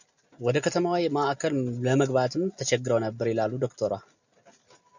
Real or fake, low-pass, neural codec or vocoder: real; 7.2 kHz; none